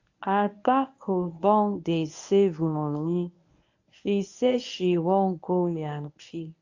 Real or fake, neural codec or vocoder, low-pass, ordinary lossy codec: fake; codec, 24 kHz, 0.9 kbps, WavTokenizer, medium speech release version 1; 7.2 kHz; AAC, 48 kbps